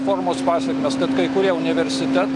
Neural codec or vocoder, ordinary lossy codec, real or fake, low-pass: none; MP3, 64 kbps; real; 10.8 kHz